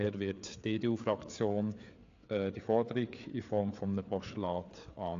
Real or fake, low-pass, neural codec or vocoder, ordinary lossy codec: fake; 7.2 kHz; codec, 16 kHz, 4 kbps, FreqCodec, larger model; AAC, 48 kbps